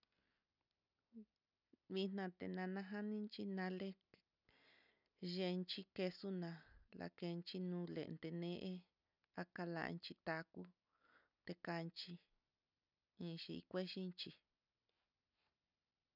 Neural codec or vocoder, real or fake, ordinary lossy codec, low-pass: none; real; none; 5.4 kHz